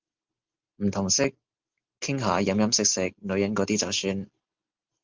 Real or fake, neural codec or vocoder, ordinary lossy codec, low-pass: real; none; Opus, 24 kbps; 7.2 kHz